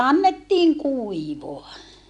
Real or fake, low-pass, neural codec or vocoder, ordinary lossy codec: real; 10.8 kHz; none; none